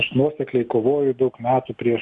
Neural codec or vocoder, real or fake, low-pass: none; real; 10.8 kHz